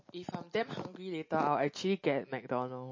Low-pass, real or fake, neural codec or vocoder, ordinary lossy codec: 7.2 kHz; real; none; MP3, 32 kbps